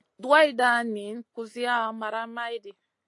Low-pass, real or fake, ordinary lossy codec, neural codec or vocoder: 10.8 kHz; real; AAC, 48 kbps; none